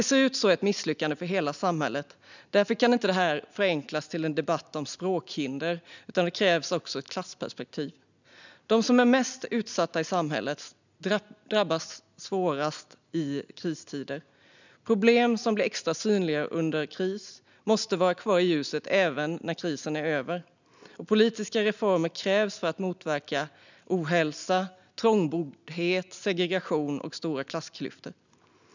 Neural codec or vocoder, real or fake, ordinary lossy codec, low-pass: none; real; none; 7.2 kHz